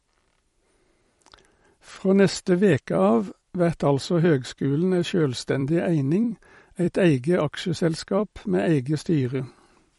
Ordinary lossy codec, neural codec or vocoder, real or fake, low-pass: MP3, 48 kbps; vocoder, 44.1 kHz, 128 mel bands every 256 samples, BigVGAN v2; fake; 19.8 kHz